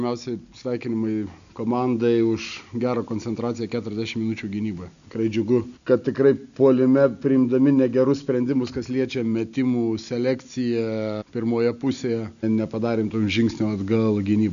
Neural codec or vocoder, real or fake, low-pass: none; real; 7.2 kHz